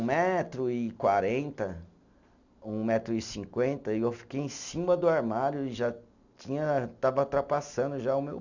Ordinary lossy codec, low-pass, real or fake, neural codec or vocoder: none; 7.2 kHz; real; none